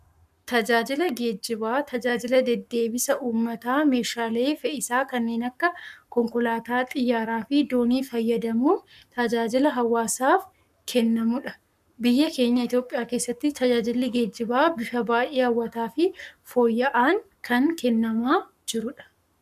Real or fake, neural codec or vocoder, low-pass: fake; codec, 44.1 kHz, 7.8 kbps, Pupu-Codec; 14.4 kHz